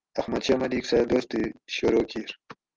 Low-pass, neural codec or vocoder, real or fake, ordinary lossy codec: 7.2 kHz; none; real; Opus, 32 kbps